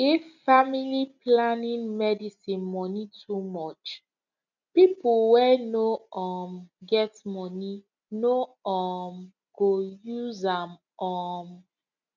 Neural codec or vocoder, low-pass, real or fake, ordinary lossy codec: none; 7.2 kHz; real; none